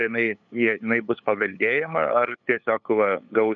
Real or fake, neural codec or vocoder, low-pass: fake; codec, 16 kHz, 8 kbps, FunCodec, trained on LibriTTS, 25 frames a second; 7.2 kHz